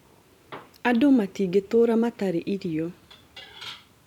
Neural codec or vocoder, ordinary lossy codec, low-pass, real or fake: vocoder, 44.1 kHz, 128 mel bands every 512 samples, BigVGAN v2; none; 19.8 kHz; fake